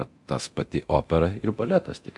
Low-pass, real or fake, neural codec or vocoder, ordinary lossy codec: 10.8 kHz; fake; codec, 24 kHz, 0.9 kbps, DualCodec; MP3, 64 kbps